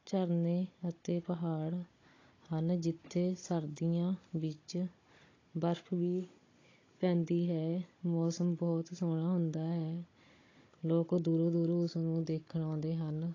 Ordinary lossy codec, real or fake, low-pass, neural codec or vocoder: AAC, 32 kbps; fake; 7.2 kHz; codec, 16 kHz, 16 kbps, FunCodec, trained on Chinese and English, 50 frames a second